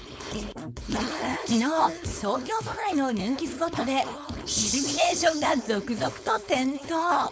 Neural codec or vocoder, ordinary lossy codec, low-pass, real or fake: codec, 16 kHz, 4.8 kbps, FACodec; none; none; fake